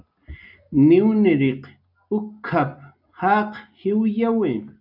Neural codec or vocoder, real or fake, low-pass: none; real; 5.4 kHz